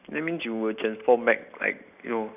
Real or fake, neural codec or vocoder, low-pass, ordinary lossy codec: real; none; 3.6 kHz; none